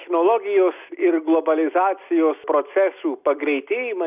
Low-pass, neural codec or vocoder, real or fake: 3.6 kHz; none; real